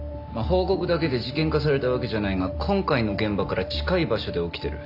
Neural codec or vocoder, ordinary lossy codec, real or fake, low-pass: none; none; real; 5.4 kHz